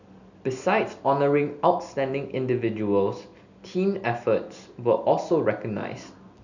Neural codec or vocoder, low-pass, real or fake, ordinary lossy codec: none; 7.2 kHz; real; none